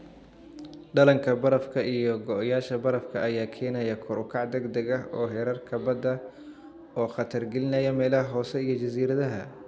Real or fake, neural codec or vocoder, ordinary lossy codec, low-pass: real; none; none; none